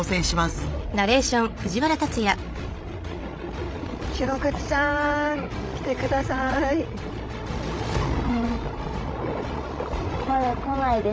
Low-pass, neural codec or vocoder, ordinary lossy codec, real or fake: none; codec, 16 kHz, 16 kbps, FreqCodec, larger model; none; fake